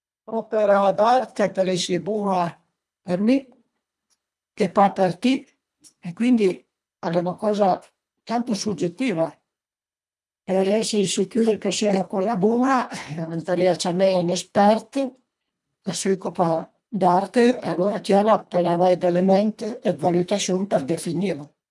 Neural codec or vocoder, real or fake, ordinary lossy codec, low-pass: codec, 24 kHz, 1.5 kbps, HILCodec; fake; none; none